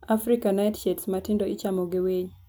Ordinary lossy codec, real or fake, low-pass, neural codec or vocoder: none; real; none; none